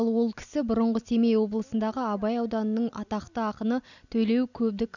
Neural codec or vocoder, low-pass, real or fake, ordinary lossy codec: none; 7.2 kHz; real; none